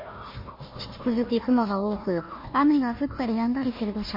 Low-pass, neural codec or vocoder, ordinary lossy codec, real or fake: 5.4 kHz; codec, 16 kHz, 1 kbps, FunCodec, trained on Chinese and English, 50 frames a second; MP3, 24 kbps; fake